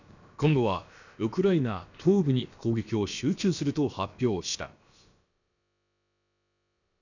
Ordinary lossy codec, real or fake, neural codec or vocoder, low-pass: none; fake; codec, 16 kHz, about 1 kbps, DyCAST, with the encoder's durations; 7.2 kHz